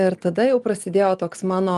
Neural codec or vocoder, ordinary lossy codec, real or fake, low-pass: none; Opus, 24 kbps; real; 10.8 kHz